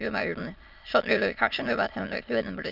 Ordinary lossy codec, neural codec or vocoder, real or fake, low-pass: none; autoencoder, 22.05 kHz, a latent of 192 numbers a frame, VITS, trained on many speakers; fake; 5.4 kHz